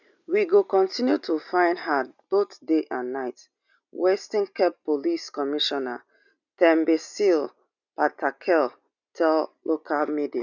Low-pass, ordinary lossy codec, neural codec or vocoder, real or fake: 7.2 kHz; none; none; real